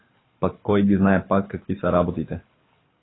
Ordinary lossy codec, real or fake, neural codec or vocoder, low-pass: AAC, 16 kbps; fake; codec, 24 kHz, 6 kbps, HILCodec; 7.2 kHz